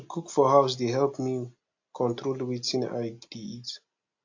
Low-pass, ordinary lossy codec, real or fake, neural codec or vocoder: 7.2 kHz; AAC, 48 kbps; real; none